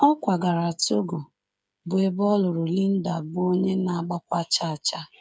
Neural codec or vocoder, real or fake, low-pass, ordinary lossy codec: codec, 16 kHz, 8 kbps, FreqCodec, smaller model; fake; none; none